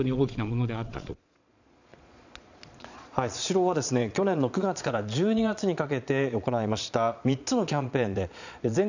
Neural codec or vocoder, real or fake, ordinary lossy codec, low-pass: vocoder, 22.05 kHz, 80 mel bands, Vocos; fake; none; 7.2 kHz